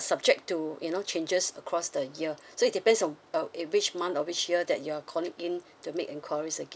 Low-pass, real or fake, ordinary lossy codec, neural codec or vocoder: none; real; none; none